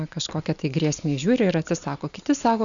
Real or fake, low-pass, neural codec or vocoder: real; 7.2 kHz; none